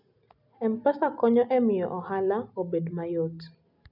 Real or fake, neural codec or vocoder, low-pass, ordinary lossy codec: real; none; 5.4 kHz; none